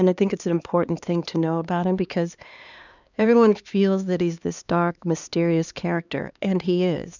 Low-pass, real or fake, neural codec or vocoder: 7.2 kHz; fake; codec, 16 kHz, 2 kbps, X-Codec, HuBERT features, trained on LibriSpeech